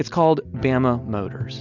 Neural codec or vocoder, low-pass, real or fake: none; 7.2 kHz; real